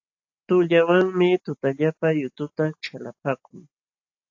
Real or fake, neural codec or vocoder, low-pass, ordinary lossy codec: real; none; 7.2 kHz; AAC, 48 kbps